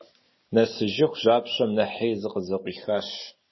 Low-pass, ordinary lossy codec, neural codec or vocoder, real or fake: 7.2 kHz; MP3, 24 kbps; none; real